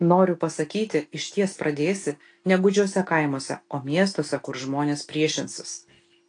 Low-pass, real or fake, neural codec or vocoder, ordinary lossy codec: 9.9 kHz; real; none; AAC, 48 kbps